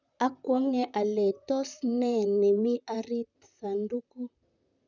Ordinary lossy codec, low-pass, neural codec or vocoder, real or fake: none; 7.2 kHz; vocoder, 44.1 kHz, 128 mel bands, Pupu-Vocoder; fake